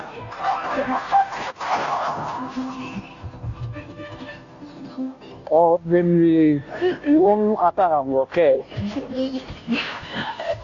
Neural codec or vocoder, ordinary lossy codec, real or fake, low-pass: codec, 16 kHz, 0.5 kbps, FunCodec, trained on Chinese and English, 25 frames a second; AAC, 48 kbps; fake; 7.2 kHz